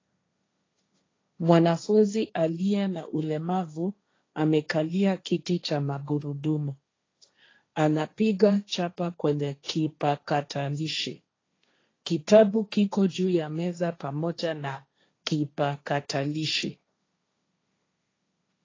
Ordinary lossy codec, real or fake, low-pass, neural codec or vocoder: AAC, 32 kbps; fake; 7.2 kHz; codec, 16 kHz, 1.1 kbps, Voila-Tokenizer